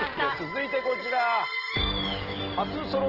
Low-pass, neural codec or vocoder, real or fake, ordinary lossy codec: 5.4 kHz; none; real; Opus, 16 kbps